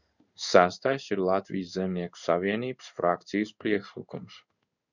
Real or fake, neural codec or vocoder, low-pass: fake; codec, 16 kHz in and 24 kHz out, 1 kbps, XY-Tokenizer; 7.2 kHz